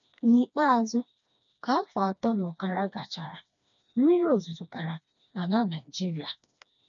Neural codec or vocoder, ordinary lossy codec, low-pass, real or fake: codec, 16 kHz, 2 kbps, FreqCodec, smaller model; none; 7.2 kHz; fake